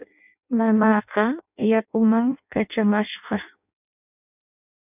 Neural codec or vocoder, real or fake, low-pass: codec, 16 kHz in and 24 kHz out, 0.6 kbps, FireRedTTS-2 codec; fake; 3.6 kHz